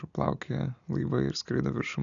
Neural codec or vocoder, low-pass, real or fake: none; 7.2 kHz; real